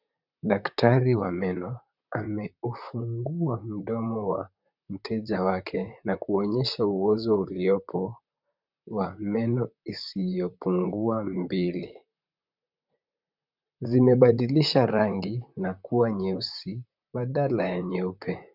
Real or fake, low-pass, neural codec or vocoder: fake; 5.4 kHz; vocoder, 44.1 kHz, 128 mel bands, Pupu-Vocoder